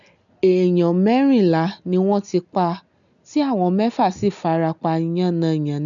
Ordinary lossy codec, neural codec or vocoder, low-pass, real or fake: none; none; 7.2 kHz; real